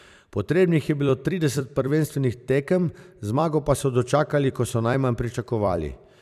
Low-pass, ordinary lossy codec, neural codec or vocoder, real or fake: 14.4 kHz; none; vocoder, 44.1 kHz, 128 mel bands every 256 samples, BigVGAN v2; fake